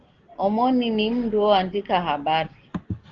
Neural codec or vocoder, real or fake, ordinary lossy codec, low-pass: none; real; Opus, 16 kbps; 7.2 kHz